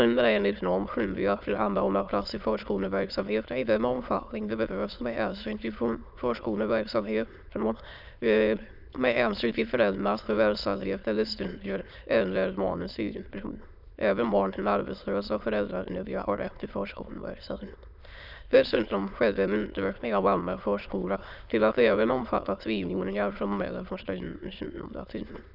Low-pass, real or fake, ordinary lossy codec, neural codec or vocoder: 5.4 kHz; fake; none; autoencoder, 22.05 kHz, a latent of 192 numbers a frame, VITS, trained on many speakers